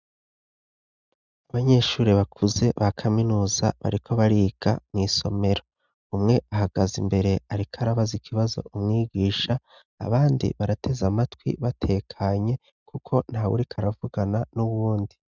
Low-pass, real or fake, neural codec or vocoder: 7.2 kHz; real; none